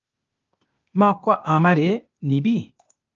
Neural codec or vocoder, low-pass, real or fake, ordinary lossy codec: codec, 16 kHz, 0.8 kbps, ZipCodec; 7.2 kHz; fake; Opus, 24 kbps